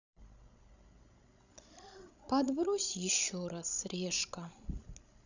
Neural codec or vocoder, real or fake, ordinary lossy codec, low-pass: codec, 16 kHz, 16 kbps, FreqCodec, larger model; fake; none; 7.2 kHz